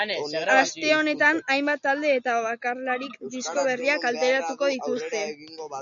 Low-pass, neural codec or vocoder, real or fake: 7.2 kHz; none; real